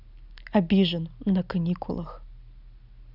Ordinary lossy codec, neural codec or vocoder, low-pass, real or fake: none; none; 5.4 kHz; real